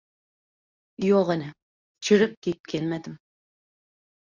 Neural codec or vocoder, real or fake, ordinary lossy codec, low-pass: codec, 24 kHz, 0.9 kbps, WavTokenizer, medium speech release version 2; fake; Opus, 64 kbps; 7.2 kHz